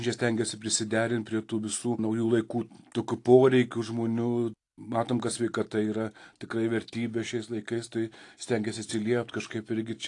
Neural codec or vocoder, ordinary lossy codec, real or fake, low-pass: none; AAC, 48 kbps; real; 10.8 kHz